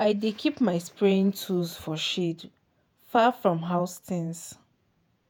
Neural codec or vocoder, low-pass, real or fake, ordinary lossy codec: vocoder, 48 kHz, 128 mel bands, Vocos; none; fake; none